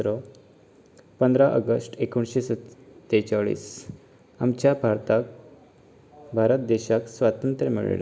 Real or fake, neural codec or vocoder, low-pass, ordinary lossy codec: real; none; none; none